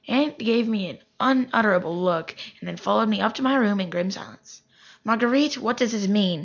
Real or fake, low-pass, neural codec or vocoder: real; 7.2 kHz; none